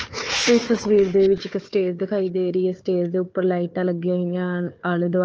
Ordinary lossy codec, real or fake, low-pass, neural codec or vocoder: Opus, 24 kbps; fake; 7.2 kHz; codec, 16 kHz, 4 kbps, FunCodec, trained on Chinese and English, 50 frames a second